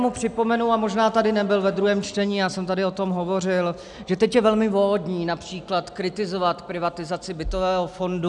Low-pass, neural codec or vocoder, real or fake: 10.8 kHz; none; real